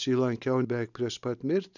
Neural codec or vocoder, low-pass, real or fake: none; 7.2 kHz; real